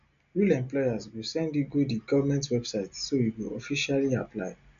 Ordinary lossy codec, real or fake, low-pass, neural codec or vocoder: none; real; 7.2 kHz; none